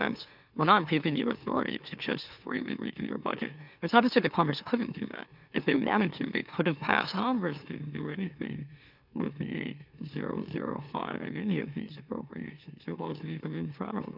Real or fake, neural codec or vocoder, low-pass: fake; autoencoder, 44.1 kHz, a latent of 192 numbers a frame, MeloTTS; 5.4 kHz